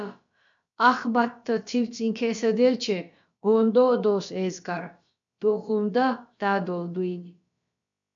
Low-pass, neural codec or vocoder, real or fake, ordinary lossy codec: 7.2 kHz; codec, 16 kHz, about 1 kbps, DyCAST, with the encoder's durations; fake; MP3, 64 kbps